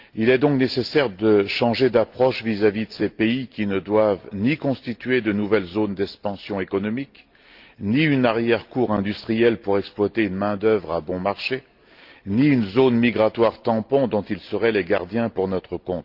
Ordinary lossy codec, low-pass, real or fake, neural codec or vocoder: Opus, 32 kbps; 5.4 kHz; real; none